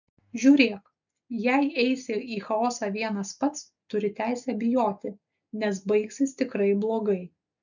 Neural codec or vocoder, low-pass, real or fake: none; 7.2 kHz; real